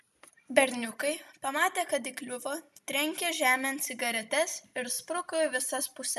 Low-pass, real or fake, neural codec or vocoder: 14.4 kHz; real; none